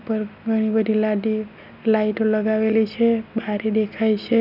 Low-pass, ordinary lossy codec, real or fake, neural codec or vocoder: 5.4 kHz; none; real; none